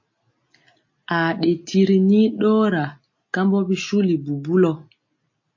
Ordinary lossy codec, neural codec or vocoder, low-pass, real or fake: MP3, 32 kbps; none; 7.2 kHz; real